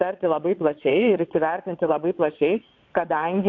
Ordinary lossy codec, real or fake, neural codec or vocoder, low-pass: AAC, 48 kbps; fake; vocoder, 22.05 kHz, 80 mel bands, WaveNeXt; 7.2 kHz